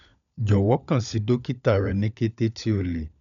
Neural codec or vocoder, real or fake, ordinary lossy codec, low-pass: codec, 16 kHz, 16 kbps, FunCodec, trained on LibriTTS, 50 frames a second; fake; none; 7.2 kHz